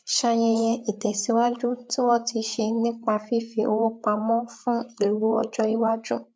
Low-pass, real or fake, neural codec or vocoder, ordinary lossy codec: none; fake; codec, 16 kHz, 8 kbps, FreqCodec, larger model; none